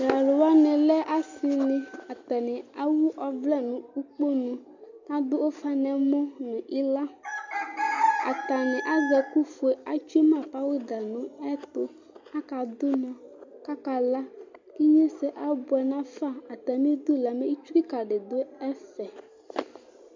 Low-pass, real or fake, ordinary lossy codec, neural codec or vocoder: 7.2 kHz; real; MP3, 48 kbps; none